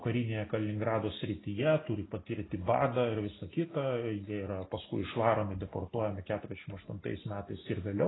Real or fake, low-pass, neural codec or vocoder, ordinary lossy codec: real; 7.2 kHz; none; AAC, 16 kbps